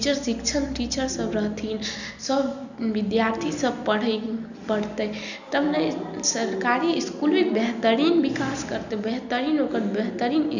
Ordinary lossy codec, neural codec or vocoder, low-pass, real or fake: none; none; 7.2 kHz; real